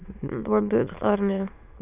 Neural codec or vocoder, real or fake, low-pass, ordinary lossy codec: autoencoder, 22.05 kHz, a latent of 192 numbers a frame, VITS, trained on many speakers; fake; 3.6 kHz; none